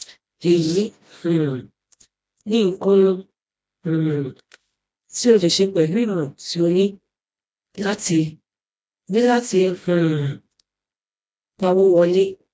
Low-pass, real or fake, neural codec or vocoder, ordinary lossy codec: none; fake; codec, 16 kHz, 1 kbps, FreqCodec, smaller model; none